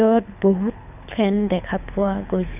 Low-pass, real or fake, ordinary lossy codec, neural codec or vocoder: 3.6 kHz; fake; none; codec, 24 kHz, 6 kbps, HILCodec